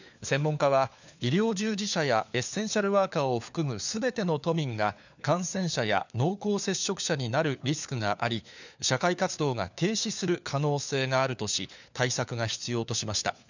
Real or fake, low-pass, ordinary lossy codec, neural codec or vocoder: fake; 7.2 kHz; none; codec, 16 kHz, 4 kbps, FunCodec, trained on LibriTTS, 50 frames a second